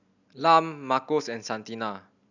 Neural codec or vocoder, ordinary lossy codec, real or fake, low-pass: none; none; real; 7.2 kHz